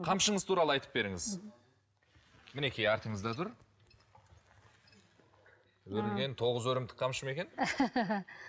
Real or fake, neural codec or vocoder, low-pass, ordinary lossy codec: real; none; none; none